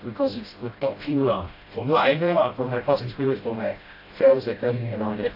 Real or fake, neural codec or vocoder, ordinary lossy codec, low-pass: fake; codec, 16 kHz, 0.5 kbps, FreqCodec, smaller model; AAC, 32 kbps; 5.4 kHz